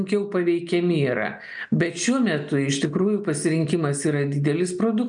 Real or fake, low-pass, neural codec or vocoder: real; 9.9 kHz; none